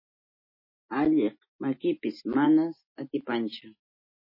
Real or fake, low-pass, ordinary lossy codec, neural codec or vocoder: real; 5.4 kHz; MP3, 24 kbps; none